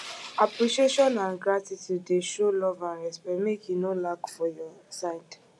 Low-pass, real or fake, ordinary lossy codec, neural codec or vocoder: none; real; none; none